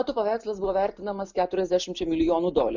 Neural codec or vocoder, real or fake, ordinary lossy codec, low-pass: none; real; MP3, 64 kbps; 7.2 kHz